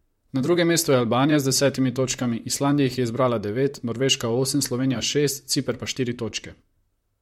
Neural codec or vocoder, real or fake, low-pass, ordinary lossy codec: vocoder, 44.1 kHz, 128 mel bands, Pupu-Vocoder; fake; 19.8 kHz; MP3, 64 kbps